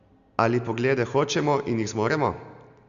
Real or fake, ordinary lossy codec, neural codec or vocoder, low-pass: real; Opus, 64 kbps; none; 7.2 kHz